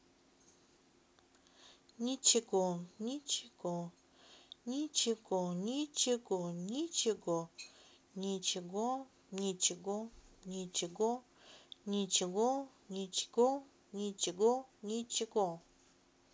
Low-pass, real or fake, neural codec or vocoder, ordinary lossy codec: none; real; none; none